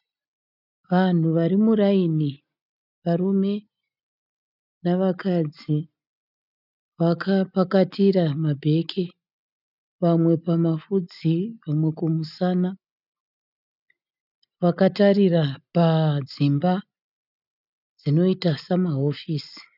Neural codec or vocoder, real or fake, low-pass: none; real; 5.4 kHz